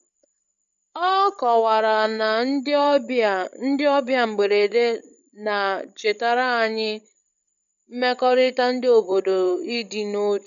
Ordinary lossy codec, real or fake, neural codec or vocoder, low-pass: none; fake; codec, 16 kHz, 16 kbps, FreqCodec, larger model; 7.2 kHz